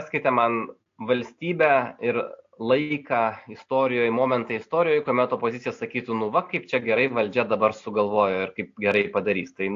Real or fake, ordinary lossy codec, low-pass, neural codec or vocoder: real; AAC, 48 kbps; 7.2 kHz; none